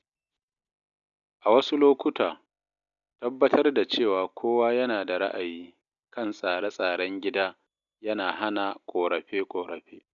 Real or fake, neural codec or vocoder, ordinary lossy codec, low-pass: real; none; none; 7.2 kHz